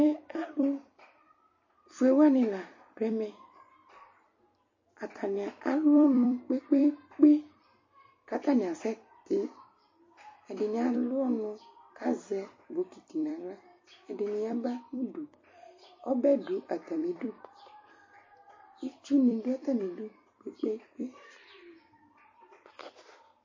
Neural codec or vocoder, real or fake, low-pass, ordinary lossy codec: vocoder, 44.1 kHz, 128 mel bands every 256 samples, BigVGAN v2; fake; 7.2 kHz; MP3, 32 kbps